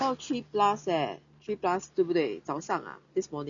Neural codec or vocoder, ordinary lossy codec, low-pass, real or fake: none; none; 7.2 kHz; real